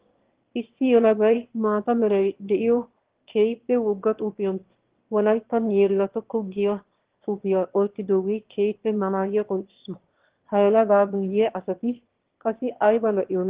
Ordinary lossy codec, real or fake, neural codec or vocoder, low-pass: Opus, 16 kbps; fake; autoencoder, 22.05 kHz, a latent of 192 numbers a frame, VITS, trained on one speaker; 3.6 kHz